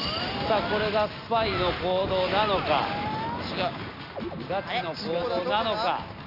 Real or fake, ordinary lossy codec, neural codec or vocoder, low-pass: real; none; none; 5.4 kHz